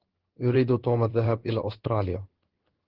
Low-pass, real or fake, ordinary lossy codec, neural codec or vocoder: 5.4 kHz; fake; Opus, 16 kbps; codec, 16 kHz in and 24 kHz out, 1 kbps, XY-Tokenizer